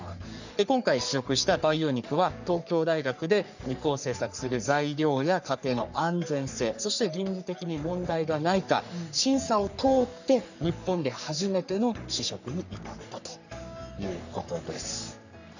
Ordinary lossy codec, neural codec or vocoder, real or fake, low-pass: none; codec, 44.1 kHz, 3.4 kbps, Pupu-Codec; fake; 7.2 kHz